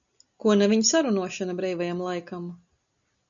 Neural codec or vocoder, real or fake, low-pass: none; real; 7.2 kHz